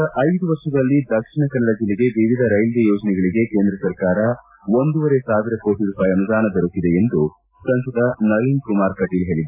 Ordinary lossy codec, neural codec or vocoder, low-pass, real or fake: AAC, 32 kbps; none; 3.6 kHz; real